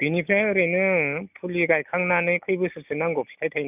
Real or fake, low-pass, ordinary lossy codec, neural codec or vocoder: real; 3.6 kHz; none; none